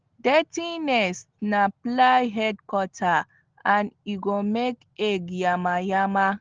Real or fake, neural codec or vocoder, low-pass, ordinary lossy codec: real; none; 7.2 kHz; Opus, 16 kbps